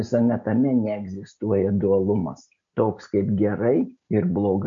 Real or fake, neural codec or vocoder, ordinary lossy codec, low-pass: real; none; MP3, 48 kbps; 7.2 kHz